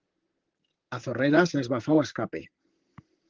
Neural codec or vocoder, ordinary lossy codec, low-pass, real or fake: vocoder, 44.1 kHz, 128 mel bands, Pupu-Vocoder; Opus, 16 kbps; 7.2 kHz; fake